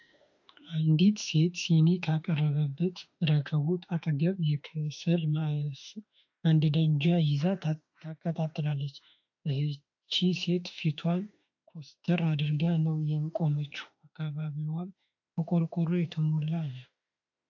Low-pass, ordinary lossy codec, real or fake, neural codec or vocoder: 7.2 kHz; AAC, 48 kbps; fake; autoencoder, 48 kHz, 32 numbers a frame, DAC-VAE, trained on Japanese speech